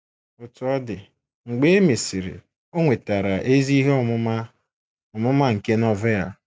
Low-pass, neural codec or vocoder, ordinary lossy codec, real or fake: none; none; none; real